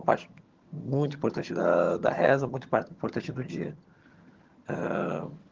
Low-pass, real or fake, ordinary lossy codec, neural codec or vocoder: 7.2 kHz; fake; Opus, 16 kbps; vocoder, 22.05 kHz, 80 mel bands, HiFi-GAN